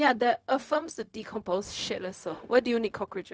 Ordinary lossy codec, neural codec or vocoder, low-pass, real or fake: none; codec, 16 kHz, 0.4 kbps, LongCat-Audio-Codec; none; fake